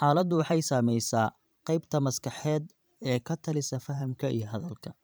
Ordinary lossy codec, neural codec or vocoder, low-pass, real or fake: none; vocoder, 44.1 kHz, 128 mel bands every 512 samples, BigVGAN v2; none; fake